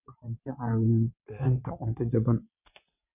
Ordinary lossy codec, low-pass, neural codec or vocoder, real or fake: none; 3.6 kHz; vocoder, 22.05 kHz, 80 mel bands, WaveNeXt; fake